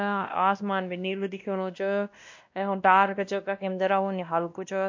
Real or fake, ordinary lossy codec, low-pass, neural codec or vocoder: fake; MP3, 48 kbps; 7.2 kHz; codec, 16 kHz, 1 kbps, X-Codec, WavLM features, trained on Multilingual LibriSpeech